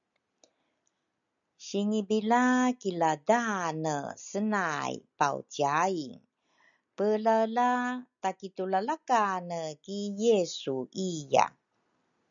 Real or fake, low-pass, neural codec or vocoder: real; 7.2 kHz; none